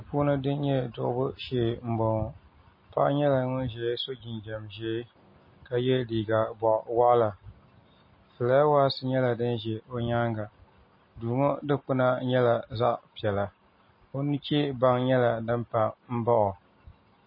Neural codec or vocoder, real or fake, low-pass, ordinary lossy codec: none; real; 5.4 kHz; MP3, 24 kbps